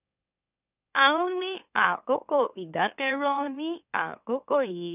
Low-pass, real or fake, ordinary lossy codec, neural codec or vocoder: 3.6 kHz; fake; none; autoencoder, 44.1 kHz, a latent of 192 numbers a frame, MeloTTS